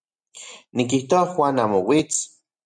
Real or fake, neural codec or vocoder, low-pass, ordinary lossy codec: real; none; 9.9 kHz; MP3, 96 kbps